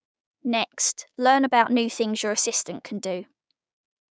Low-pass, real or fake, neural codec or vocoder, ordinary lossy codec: none; fake; codec, 16 kHz, 6 kbps, DAC; none